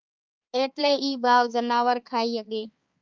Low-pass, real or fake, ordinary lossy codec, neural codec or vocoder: 7.2 kHz; fake; Opus, 24 kbps; codec, 16 kHz, 4 kbps, X-Codec, HuBERT features, trained on balanced general audio